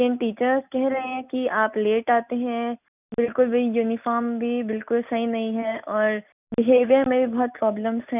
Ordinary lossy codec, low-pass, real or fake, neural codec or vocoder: none; 3.6 kHz; real; none